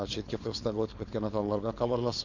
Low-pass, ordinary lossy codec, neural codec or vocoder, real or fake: 7.2 kHz; MP3, 64 kbps; codec, 16 kHz, 4.8 kbps, FACodec; fake